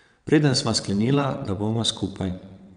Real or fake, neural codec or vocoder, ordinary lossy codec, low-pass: fake; vocoder, 22.05 kHz, 80 mel bands, WaveNeXt; none; 9.9 kHz